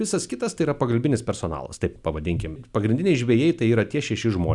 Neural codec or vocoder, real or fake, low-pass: none; real; 10.8 kHz